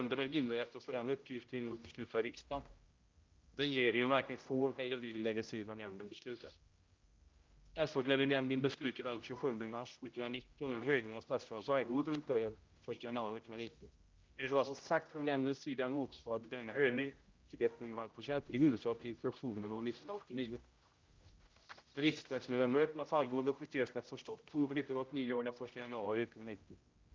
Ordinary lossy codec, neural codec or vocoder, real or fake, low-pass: Opus, 24 kbps; codec, 16 kHz, 0.5 kbps, X-Codec, HuBERT features, trained on general audio; fake; 7.2 kHz